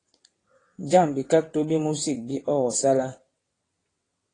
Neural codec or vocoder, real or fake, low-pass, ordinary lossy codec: vocoder, 22.05 kHz, 80 mel bands, WaveNeXt; fake; 9.9 kHz; AAC, 32 kbps